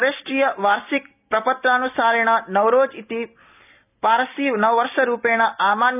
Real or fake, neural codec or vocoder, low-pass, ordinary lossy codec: real; none; 3.6 kHz; none